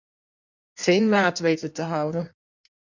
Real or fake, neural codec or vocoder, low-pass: fake; codec, 16 kHz in and 24 kHz out, 1.1 kbps, FireRedTTS-2 codec; 7.2 kHz